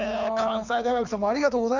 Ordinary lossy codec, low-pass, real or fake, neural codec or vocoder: none; 7.2 kHz; fake; codec, 24 kHz, 6 kbps, HILCodec